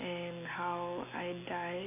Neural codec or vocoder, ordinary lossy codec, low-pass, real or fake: none; none; 3.6 kHz; real